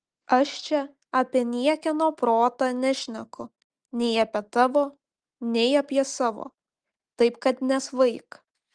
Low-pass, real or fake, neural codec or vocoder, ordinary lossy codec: 9.9 kHz; real; none; Opus, 24 kbps